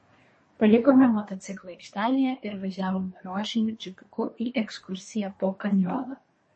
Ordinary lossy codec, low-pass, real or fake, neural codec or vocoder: MP3, 32 kbps; 9.9 kHz; fake; codec, 24 kHz, 1 kbps, SNAC